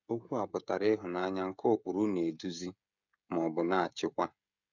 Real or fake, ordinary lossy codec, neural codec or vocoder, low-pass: fake; none; codec, 16 kHz, 16 kbps, FreqCodec, smaller model; 7.2 kHz